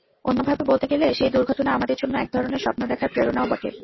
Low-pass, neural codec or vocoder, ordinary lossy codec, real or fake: 7.2 kHz; none; MP3, 24 kbps; real